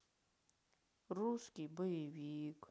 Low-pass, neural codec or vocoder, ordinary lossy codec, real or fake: none; none; none; real